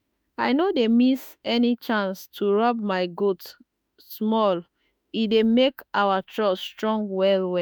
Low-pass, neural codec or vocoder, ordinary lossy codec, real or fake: none; autoencoder, 48 kHz, 32 numbers a frame, DAC-VAE, trained on Japanese speech; none; fake